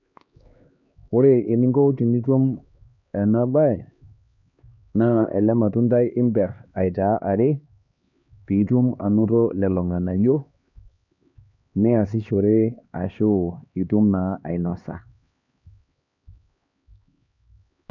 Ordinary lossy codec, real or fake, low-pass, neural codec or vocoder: none; fake; 7.2 kHz; codec, 16 kHz, 2 kbps, X-Codec, HuBERT features, trained on LibriSpeech